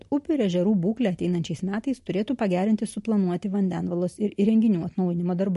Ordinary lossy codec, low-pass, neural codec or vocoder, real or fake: MP3, 48 kbps; 14.4 kHz; none; real